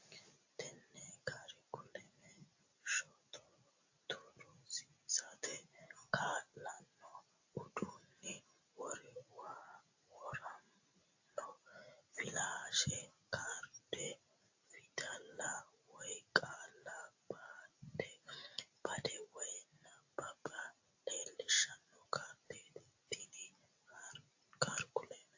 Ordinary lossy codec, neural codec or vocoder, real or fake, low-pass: AAC, 48 kbps; none; real; 7.2 kHz